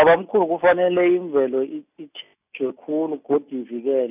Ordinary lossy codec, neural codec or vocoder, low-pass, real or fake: none; none; 3.6 kHz; real